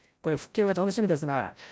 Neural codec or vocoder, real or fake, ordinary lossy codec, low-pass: codec, 16 kHz, 0.5 kbps, FreqCodec, larger model; fake; none; none